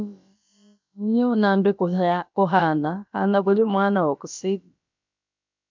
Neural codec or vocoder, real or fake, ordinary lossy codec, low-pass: codec, 16 kHz, about 1 kbps, DyCAST, with the encoder's durations; fake; MP3, 64 kbps; 7.2 kHz